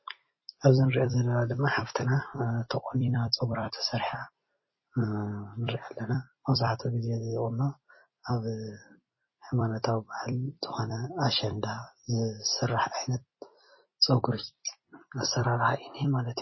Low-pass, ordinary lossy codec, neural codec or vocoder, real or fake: 7.2 kHz; MP3, 24 kbps; vocoder, 44.1 kHz, 128 mel bands every 256 samples, BigVGAN v2; fake